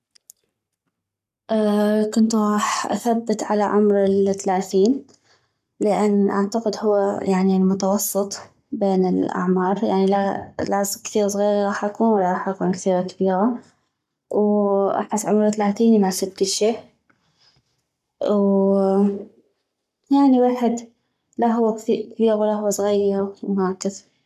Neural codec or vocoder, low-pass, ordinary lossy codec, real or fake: codec, 44.1 kHz, 7.8 kbps, Pupu-Codec; 14.4 kHz; none; fake